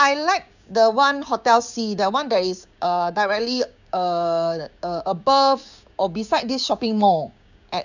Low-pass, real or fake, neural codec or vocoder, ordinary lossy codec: 7.2 kHz; fake; codec, 16 kHz, 6 kbps, DAC; none